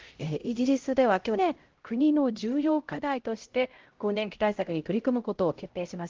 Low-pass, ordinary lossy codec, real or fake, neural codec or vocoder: 7.2 kHz; Opus, 16 kbps; fake; codec, 16 kHz, 0.5 kbps, X-Codec, HuBERT features, trained on LibriSpeech